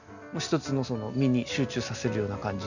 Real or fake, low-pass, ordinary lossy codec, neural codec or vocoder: real; 7.2 kHz; none; none